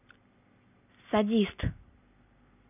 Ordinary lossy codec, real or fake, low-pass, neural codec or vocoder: none; real; 3.6 kHz; none